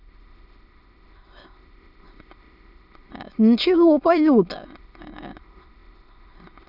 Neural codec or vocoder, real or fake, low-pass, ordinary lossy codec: autoencoder, 22.05 kHz, a latent of 192 numbers a frame, VITS, trained on many speakers; fake; 5.4 kHz; none